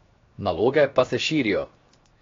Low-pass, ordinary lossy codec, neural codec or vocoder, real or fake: 7.2 kHz; AAC, 32 kbps; codec, 16 kHz, 0.7 kbps, FocalCodec; fake